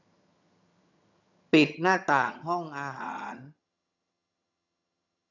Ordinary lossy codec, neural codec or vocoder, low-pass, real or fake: none; vocoder, 22.05 kHz, 80 mel bands, HiFi-GAN; 7.2 kHz; fake